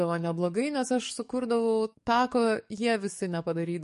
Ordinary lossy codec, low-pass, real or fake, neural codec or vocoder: MP3, 48 kbps; 14.4 kHz; fake; codec, 44.1 kHz, 7.8 kbps, DAC